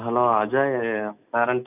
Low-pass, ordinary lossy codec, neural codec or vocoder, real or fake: 3.6 kHz; none; none; real